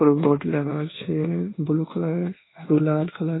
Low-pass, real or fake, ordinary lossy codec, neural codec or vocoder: 7.2 kHz; fake; AAC, 16 kbps; codec, 24 kHz, 1.2 kbps, DualCodec